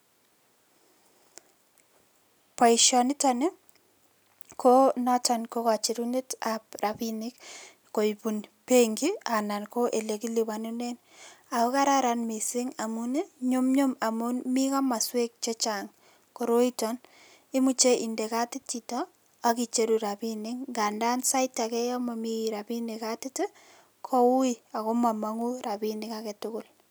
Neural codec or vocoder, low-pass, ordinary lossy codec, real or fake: none; none; none; real